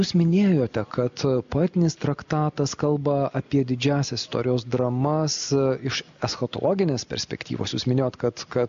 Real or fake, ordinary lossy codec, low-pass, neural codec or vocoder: real; MP3, 48 kbps; 7.2 kHz; none